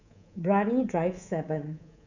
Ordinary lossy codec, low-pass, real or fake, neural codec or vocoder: none; 7.2 kHz; fake; codec, 24 kHz, 3.1 kbps, DualCodec